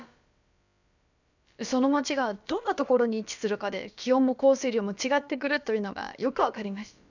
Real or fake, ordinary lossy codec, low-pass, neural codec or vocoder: fake; none; 7.2 kHz; codec, 16 kHz, about 1 kbps, DyCAST, with the encoder's durations